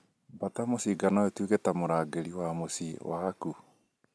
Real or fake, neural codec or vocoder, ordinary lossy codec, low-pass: real; none; none; none